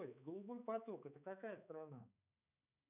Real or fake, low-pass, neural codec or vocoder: fake; 3.6 kHz; codec, 16 kHz, 4 kbps, X-Codec, HuBERT features, trained on balanced general audio